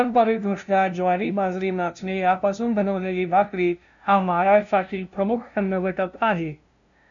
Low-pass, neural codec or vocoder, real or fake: 7.2 kHz; codec, 16 kHz, 0.5 kbps, FunCodec, trained on LibriTTS, 25 frames a second; fake